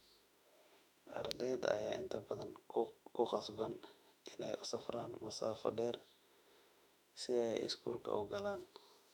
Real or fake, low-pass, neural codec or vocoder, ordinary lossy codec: fake; 19.8 kHz; autoencoder, 48 kHz, 32 numbers a frame, DAC-VAE, trained on Japanese speech; none